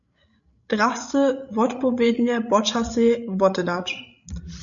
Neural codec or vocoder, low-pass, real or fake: codec, 16 kHz, 16 kbps, FreqCodec, larger model; 7.2 kHz; fake